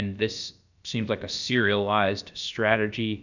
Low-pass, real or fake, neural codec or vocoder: 7.2 kHz; fake; codec, 16 kHz, about 1 kbps, DyCAST, with the encoder's durations